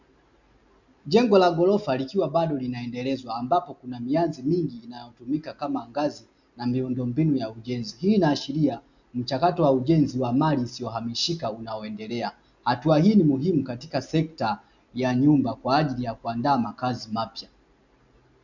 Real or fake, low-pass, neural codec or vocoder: real; 7.2 kHz; none